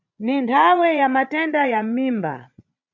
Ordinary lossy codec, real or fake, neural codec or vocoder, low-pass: MP3, 64 kbps; fake; vocoder, 22.05 kHz, 80 mel bands, Vocos; 7.2 kHz